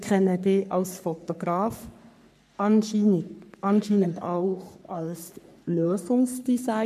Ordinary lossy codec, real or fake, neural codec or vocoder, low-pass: MP3, 96 kbps; fake; codec, 44.1 kHz, 3.4 kbps, Pupu-Codec; 14.4 kHz